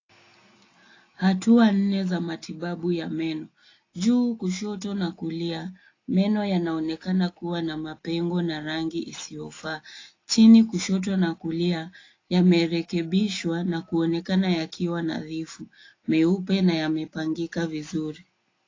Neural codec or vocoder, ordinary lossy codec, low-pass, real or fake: none; AAC, 32 kbps; 7.2 kHz; real